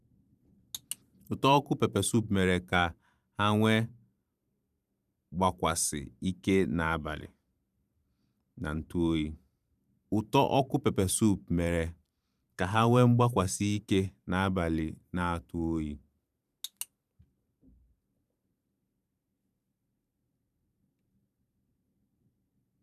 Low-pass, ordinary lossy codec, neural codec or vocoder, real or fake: 14.4 kHz; none; vocoder, 44.1 kHz, 128 mel bands every 512 samples, BigVGAN v2; fake